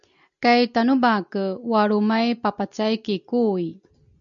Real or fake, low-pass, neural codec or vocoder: real; 7.2 kHz; none